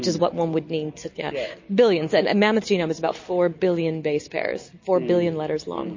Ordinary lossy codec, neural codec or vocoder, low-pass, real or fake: MP3, 32 kbps; none; 7.2 kHz; real